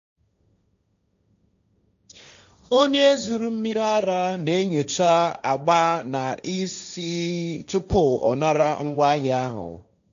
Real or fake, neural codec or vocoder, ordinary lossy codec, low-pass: fake; codec, 16 kHz, 1.1 kbps, Voila-Tokenizer; MP3, 64 kbps; 7.2 kHz